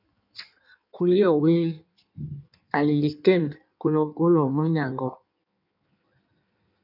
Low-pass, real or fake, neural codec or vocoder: 5.4 kHz; fake; codec, 16 kHz in and 24 kHz out, 1.1 kbps, FireRedTTS-2 codec